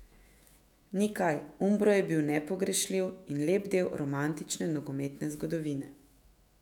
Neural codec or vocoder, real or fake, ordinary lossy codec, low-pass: autoencoder, 48 kHz, 128 numbers a frame, DAC-VAE, trained on Japanese speech; fake; none; 19.8 kHz